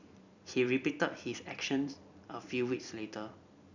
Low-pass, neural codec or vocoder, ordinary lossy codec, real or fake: 7.2 kHz; vocoder, 44.1 kHz, 128 mel bands every 256 samples, BigVGAN v2; none; fake